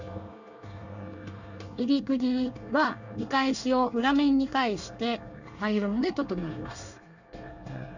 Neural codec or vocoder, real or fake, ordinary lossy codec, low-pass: codec, 24 kHz, 1 kbps, SNAC; fake; none; 7.2 kHz